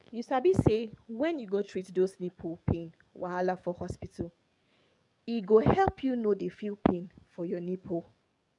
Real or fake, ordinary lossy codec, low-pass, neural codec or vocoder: fake; MP3, 96 kbps; 10.8 kHz; codec, 44.1 kHz, 7.8 kbps, DAC